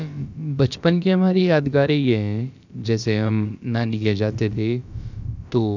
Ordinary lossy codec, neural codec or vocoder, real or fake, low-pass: none; codec, 16 kHz, about 1 kbps, DyCAST, with the encoder's durations; fake; 7.2 kHz